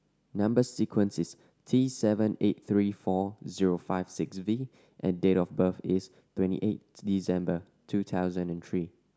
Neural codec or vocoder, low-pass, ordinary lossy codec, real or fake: none; none; none; real